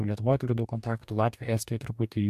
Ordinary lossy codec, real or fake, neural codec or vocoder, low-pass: MP3, 96 kbps; fake; codec, 44.1 kHz, 2.6 kbps, DAC; 14.4 kHz